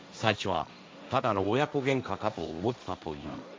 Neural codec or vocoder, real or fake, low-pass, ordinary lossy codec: codec, 16 kHz, 1.1 kbps, Voila-Tokenizer; fake; none; none